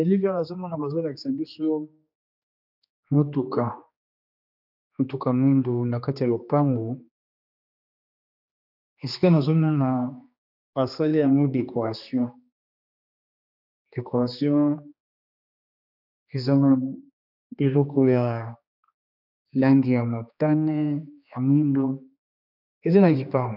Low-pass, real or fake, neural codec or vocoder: 5.4 kHz; fake; codec, 16 kHz, 2 kbps, X-Codec, HuBERT features, trained on general audio